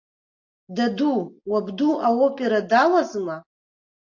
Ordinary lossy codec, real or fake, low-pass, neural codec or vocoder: AAC, 48 kbps; real; 7.2 kHz; none